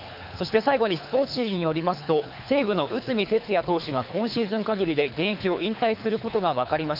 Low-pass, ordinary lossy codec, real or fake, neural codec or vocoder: 5.4 kHz; none; fake; codec, 24 kHz, 3 kbps, HILCodec